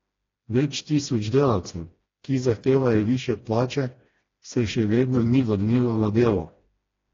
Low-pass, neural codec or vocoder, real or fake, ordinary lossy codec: 7.2 kHz; codec, 16 kHz, 1 kbps, FreqCodec, smaller model; fake; AAC, 32 kbps